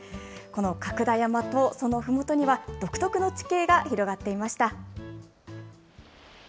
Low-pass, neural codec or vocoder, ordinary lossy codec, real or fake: none; none; none; real